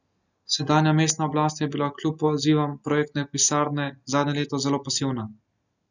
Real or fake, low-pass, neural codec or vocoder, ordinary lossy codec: real; 7.2 kHz; none; none